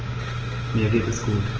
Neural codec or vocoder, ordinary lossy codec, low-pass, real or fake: none; Opus, 16 kbps; 7.2 kHz; real